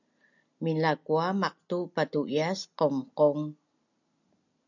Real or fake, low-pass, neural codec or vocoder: real; 7.2 kHz; none